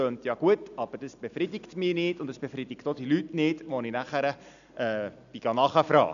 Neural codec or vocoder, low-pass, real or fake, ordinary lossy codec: none; 7.2 kHz; real; none